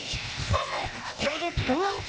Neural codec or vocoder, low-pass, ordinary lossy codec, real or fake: codec, 16 kHz, 0.8 kbps, ZipCodec; none; none; fake